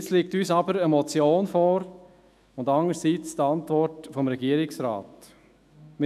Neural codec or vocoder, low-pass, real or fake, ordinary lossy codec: autoencoder, 48 kHz, 128 numbers a frame, DAC-VAE, trained on Japanese speech; 14.4 kHz; fake; none